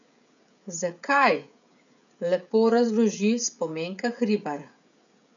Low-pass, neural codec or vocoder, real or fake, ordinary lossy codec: 7.2 kHz; codec, 16 kHz, 16 kbps, FreqCodec, smaller model; fake; none